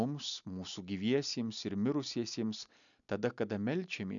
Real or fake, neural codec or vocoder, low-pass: real; none; 7.2 kHz